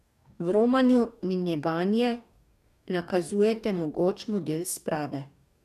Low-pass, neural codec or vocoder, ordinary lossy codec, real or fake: 14.4 kHz; codec, 44.1 kHz, 2.6 kbps, DAC; none; fake